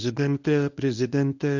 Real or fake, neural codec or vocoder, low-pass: fake; codec, 24 kHz, 0.9 kbps, WavTokenizer, medium speech release version 1; 7.2 kHz